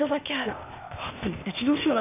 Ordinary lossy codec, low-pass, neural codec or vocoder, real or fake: none; 3.6 kHz; codec, 16 kHz, 0.8 kbps, ZipCodec; fake